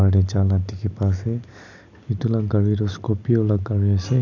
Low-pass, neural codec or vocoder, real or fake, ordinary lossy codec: 7.2 kHz; none; real; none